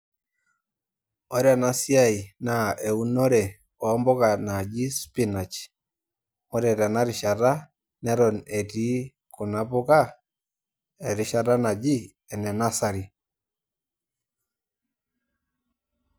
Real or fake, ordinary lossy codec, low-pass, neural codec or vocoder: real; none; none; none